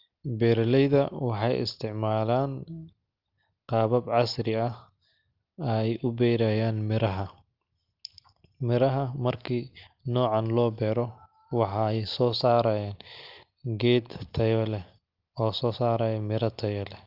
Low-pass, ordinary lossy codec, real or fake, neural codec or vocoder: 5.4 kHz; Opus, 32 kbps; real; none